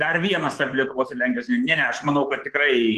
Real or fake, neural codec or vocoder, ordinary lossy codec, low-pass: fake; codec, 24 kHz, 3.1 kbps, DualCodec; Opus, 16 kbps; 10.8 kHz